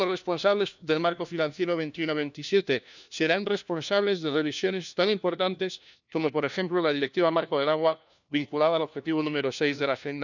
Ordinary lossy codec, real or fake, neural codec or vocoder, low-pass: none; fake; codec, 16 kHz, 1 kbps, FunCodec, trained on LibriTTS, 50 frames a second; 7.2 kHz